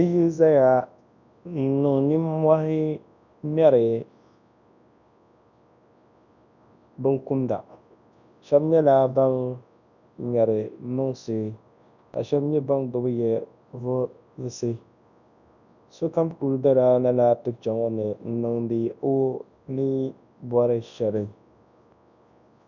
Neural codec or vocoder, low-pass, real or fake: codec, 24 kHz, 0.9 kbps, WavTokenizer, large speech release; 7.2 kHz; fake